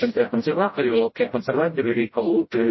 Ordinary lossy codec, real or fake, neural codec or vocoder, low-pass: MP3, 24 kbps; fake; codec, 16 kHz, 0.5 kbps, FreqCodec, smaller model; 7.2 kHz